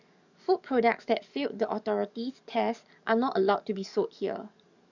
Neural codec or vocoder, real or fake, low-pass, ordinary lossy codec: codec, 44.1 kHz, 7.8 kbps, DAC; fake; 7.2 kHz; none